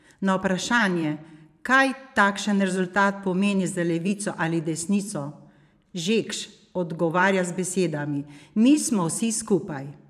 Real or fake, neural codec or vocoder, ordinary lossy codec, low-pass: fake; vocoder, 44.1 kHz, 128 mel bands every 512 samples, BigVGAN v2; none; 14.4 kHz